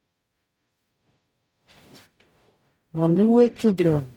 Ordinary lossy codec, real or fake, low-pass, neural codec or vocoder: MP3, 96 kbps; fake; 19.8 kHz; codec, 44.1 kHz, 0.9 kbps, DAC